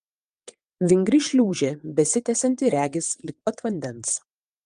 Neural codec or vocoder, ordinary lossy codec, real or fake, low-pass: vocoder, 22.05 kHz, 80 mel bands, WaveNeXt; Opus, 32 kbps; fake; 9.9 kHz